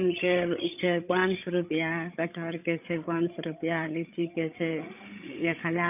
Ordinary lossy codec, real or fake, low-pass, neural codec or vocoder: none; fake; 3.6 kHz; codec, 16 kHz, 8 kbps, FreqCodec, larger model